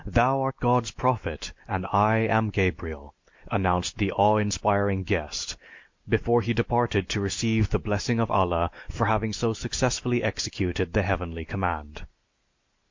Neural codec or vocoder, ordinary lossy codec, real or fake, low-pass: none; MP3, 48 kbps; real; 7.2 kHz